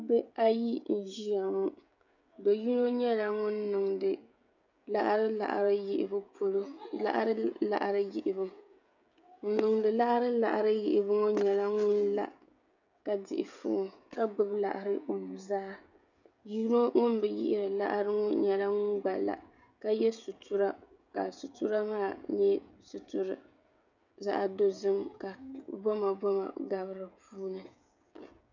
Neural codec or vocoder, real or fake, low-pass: codec, 16 kHz, 16 kbps, FreqCodec, smaller model; fake; 7.2 kHz